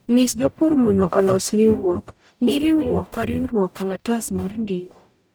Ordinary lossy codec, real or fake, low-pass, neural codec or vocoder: none; fake; none; codec, 44.1 kHz, 0.9 kbps, DAC